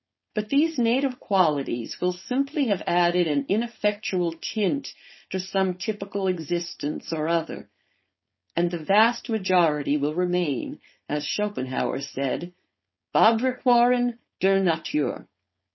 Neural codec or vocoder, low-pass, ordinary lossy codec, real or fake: codec, 16 kHz, 4.8 kbps, FACodec; 7.2 kHz; MP3, 24 kbps; fake